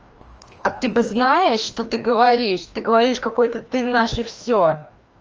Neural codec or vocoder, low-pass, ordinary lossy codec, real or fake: codec, 16 kHz, 1 kbps, FreqCodec, larger model; 7.2 kHz; Opus, 24 kbps; fake